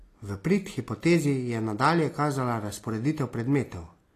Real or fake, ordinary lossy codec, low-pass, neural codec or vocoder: real; AAC, 48 kbps; 14.4 kHz; none